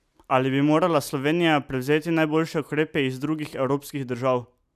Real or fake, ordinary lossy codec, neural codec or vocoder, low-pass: real; none; none; 14.4 kHz